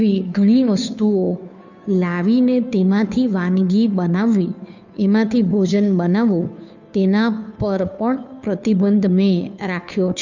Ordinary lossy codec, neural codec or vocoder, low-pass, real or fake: none; codec, 16 kHz, 2 kbps, FunCodec, trained on Chinese and English, 25 frames a second; 7.2 kHz; fake